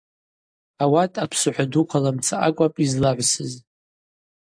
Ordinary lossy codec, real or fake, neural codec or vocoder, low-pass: AAC, 64 kbps; real; none; 9.9 kHz